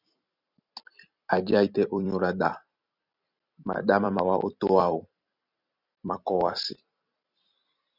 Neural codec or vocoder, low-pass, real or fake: vocoder, 44.1 kHz, 128 mel bands every 256 samples, BigVGAN v2; 5.4 kHz; fake